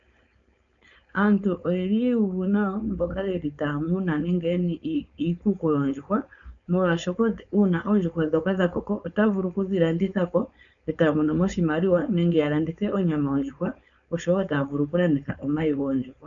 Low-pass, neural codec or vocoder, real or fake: 7.2 kHz; codec, 16 kHz, 4.8 kbps, FACodec; fake